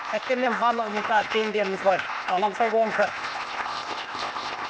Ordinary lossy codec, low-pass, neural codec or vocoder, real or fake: none; none; codec, 16 kHz, 0.8 kbps, ZipCodec; fake